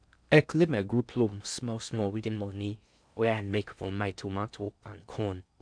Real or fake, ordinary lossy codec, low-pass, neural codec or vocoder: fake; none; 9.9 kHz; codec, 16 kHz in and 24 kHz out, 0.6 kbps, FocalCodec, streaming, 4096 codes